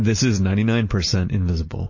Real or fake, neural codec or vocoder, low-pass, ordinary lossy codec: real; none; 7.2 kHz; MP3, 32 kbps